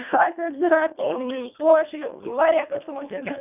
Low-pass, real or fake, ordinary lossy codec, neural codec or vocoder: 3.6 kHz; fake; none; codec, 24 kHz, 1.5 kbps, HILCodec